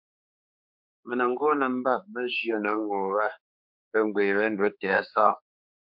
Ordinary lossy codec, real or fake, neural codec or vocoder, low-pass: MP3, 48 kbps; fake; codec, 16 kHz, 4 kbps, X-Codec, HuBERT features, trained on general audio; 5.4 kHz